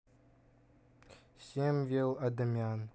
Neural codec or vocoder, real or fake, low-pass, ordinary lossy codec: none; real; none; none